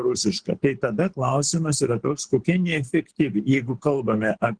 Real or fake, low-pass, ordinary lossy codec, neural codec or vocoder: fake; 9.9 kHz; Opus, 16 kbps; codec, 24 kHz, 6 kbps, HILCodec